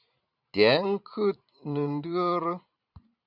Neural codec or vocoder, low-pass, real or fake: vocoder, 44.1 kHz, 128 mel bands every 512 samples, BigVGAN v2; 5.4 kHz; fake